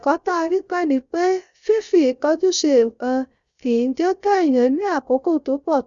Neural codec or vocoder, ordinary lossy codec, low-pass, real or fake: codec, 16 kHz, 0.3 kbps, FocalCodec; Opus, 64 kbps; 7.2 kHz; fake